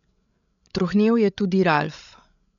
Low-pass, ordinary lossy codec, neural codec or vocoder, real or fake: 7.2 kHz; none; codec, 16 kHz, 16 kbps, FreqCodec, larger model; fake